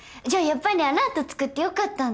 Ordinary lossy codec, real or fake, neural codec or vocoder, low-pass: none; real; none; none